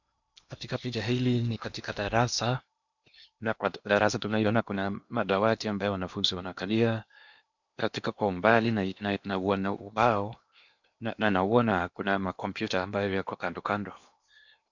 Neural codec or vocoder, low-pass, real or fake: codec, 16 kHz in and 24 kHz out, 0.8 kbps, FocalCodec, streaming, 65536 codes; 7.2 kHz; fake